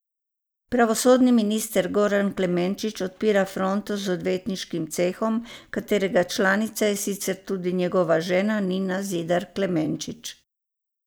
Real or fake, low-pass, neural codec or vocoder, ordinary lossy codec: real; none; none; none